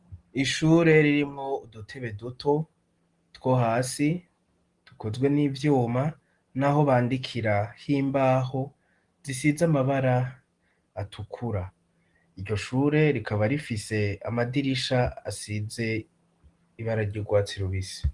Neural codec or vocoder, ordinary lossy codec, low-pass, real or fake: none; Opus, 24 kbps; 10.8 kHz; real